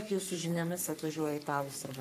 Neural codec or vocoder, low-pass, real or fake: codec, 44.1 kHz, 3.4 kbps, Pupu-Codec; 14.4 kHz; fake